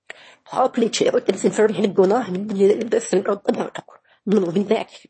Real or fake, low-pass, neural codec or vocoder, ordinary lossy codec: fake; 9.9 kHz; autoencoder, 22.05 kHz, a latent of 192 numbers a frame, VITS, trained on one speaker; MP3, 32 kbps